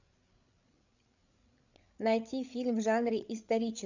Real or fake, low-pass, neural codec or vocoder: fake; 7.2 kHz; codec, 16 kHz, 16 kbps, FreqCodec, larger model